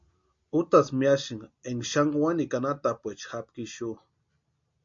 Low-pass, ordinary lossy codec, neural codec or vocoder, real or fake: 7.2 kHz; MP3, 48 kbps; none; real